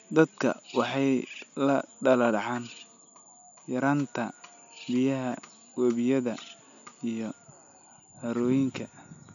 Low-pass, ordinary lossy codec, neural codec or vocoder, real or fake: 7.2 kHz; none; none; real